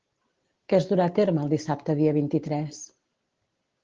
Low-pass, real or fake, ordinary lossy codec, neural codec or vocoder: 7.2 kHz; real; Opus, 16 kbps; none